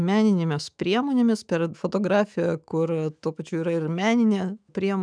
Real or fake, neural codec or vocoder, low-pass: fake; autoencoder, 48 kHz, 128 numbers a frame, DAC-VAE, trained on Japanese speech; 9.9 kHz